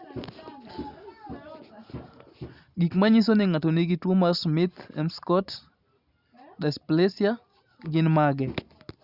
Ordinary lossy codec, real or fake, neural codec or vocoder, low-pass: Opus, 64 kbps; real; none; 5.4 kHz